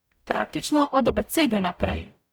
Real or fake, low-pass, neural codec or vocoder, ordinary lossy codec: fake; none; codec, 44.1 kHz, 0.9 kbps, DAC; none